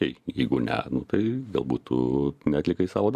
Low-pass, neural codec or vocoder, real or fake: 14.4 kHz; vocoder, 44.1 kHz, 128 mel bands every 512 samples, BigVGAN v2; fake